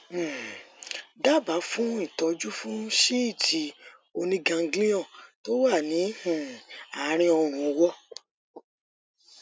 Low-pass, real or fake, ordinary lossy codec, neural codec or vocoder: none; real; none; none